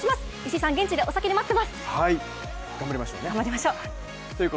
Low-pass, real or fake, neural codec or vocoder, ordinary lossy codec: none; real; none; none